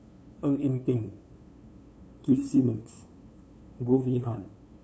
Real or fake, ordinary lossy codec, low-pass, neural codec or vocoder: fake; none; none; codec, 16 kHz, 2 kbps, FunCodec, trained on LibriTTS, 25 frames a second